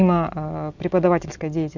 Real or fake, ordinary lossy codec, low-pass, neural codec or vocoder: real; none; 7.2 kHz; none